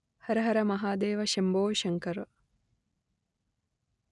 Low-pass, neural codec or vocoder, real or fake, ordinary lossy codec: 10.8 kHz; none; real; none